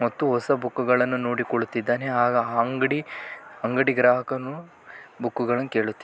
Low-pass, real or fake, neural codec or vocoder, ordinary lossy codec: none; real; none; none